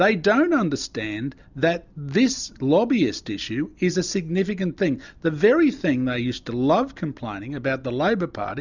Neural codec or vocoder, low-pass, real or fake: none; 7.2 kHz; real